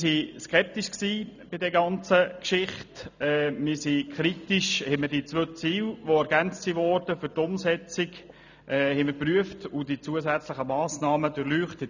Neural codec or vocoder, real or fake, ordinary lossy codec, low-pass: none; real; none; 7.2 kHz